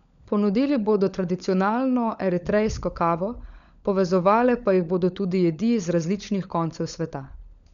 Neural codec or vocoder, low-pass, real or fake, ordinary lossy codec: codec, 16 kHz, 16 kbps, FunCodec, trained on LibriTTS, 50 frames a second; 7.2 kHz; fake; none